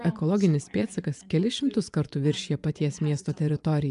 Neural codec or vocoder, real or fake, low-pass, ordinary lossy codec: vocoder, 24 kHz, 100 mel bands, Vocos; fake; 10.8 kHz; MP3, 96 kbps